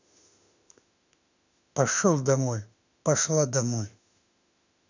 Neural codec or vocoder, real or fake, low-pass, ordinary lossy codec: autoencoder, 48 kHz, 32 numbers a frame, DAC-VAE, trained on Japanese speech; fake; 7.2 kHz; none